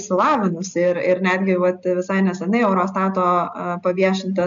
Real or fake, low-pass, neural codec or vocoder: real; 7.2 kHz; none